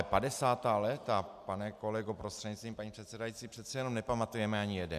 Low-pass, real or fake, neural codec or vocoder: 14.4 kHz; real; none